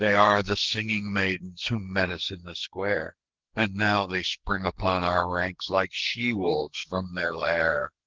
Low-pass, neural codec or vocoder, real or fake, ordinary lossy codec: 7.2 kHz; codec, 44.1 kHz, 2.6 kbps, SNAC; fake; Opus, 16 kbps